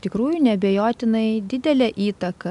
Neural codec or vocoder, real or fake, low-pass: none; real; 10.8 kHz